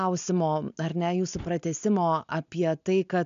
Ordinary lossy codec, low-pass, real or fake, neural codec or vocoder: MP3, 64 kbps; 7.2 kHz; real; none